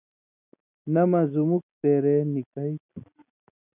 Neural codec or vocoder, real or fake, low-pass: none; real; 3.6 kHz